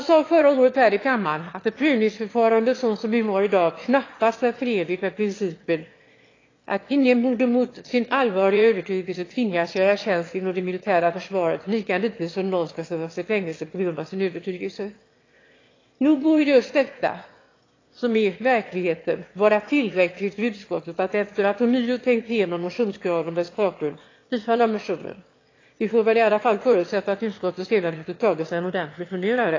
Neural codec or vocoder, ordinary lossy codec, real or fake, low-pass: autoencoder, 22.05 kHz, a latent of 192 numbers a frame, VITS, trained on one speaker; AAC, 32 kbps; fake; 7.2 kHz